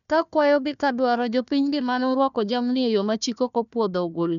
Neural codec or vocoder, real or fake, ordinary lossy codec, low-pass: codec, 16 kHz, 1 kbps, FunCodec, trained on Chinese and English, 50 frames a second; fake; none; 7.2 kHz